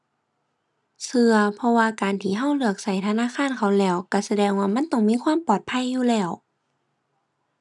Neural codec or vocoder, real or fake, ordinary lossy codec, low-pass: none; real; none; none